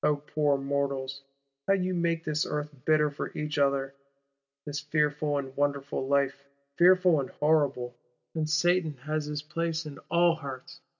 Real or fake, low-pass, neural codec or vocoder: real; 7.2 kHz; none